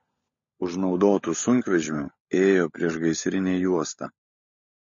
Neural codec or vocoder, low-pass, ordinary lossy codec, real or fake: codec, 16 kHz, 16 kbps, FunCodec, trained on LibriTTS, 50 frames a second; 7.2 kHz; MP3, 32 kbps; fake